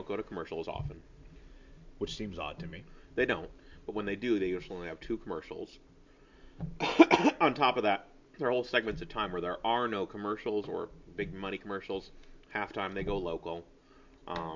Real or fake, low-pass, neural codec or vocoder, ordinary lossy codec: real; 7.2 kHz; none; MP3, 64 kbps